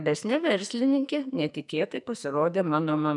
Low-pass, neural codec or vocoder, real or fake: 10.8 kHz; codec, 44.1 kHz, 2.6 kbps, SNAC; fake